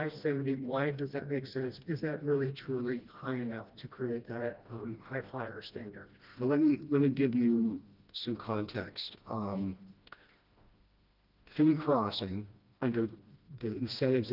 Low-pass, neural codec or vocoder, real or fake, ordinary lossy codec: 5.4 kHz; codec, 16 kHz, 1 kbps, FreqCodec, smaller model; fake; Opus, 24 kbps